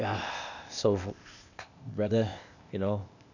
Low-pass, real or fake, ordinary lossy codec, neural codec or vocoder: 7.2 kHz; fake; none; codec, 16 kHz, 0.8 kbps, ZipCodec